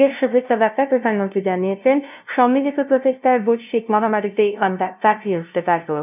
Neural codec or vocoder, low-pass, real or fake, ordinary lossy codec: codec, 16 kHz, 0.5 kbps, FunCodec, trained on LibriTTS, 25 frames a second; 3.6 kHz; fake; none